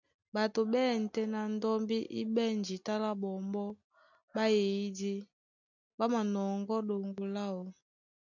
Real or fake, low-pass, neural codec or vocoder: real; 7.2 kHz; none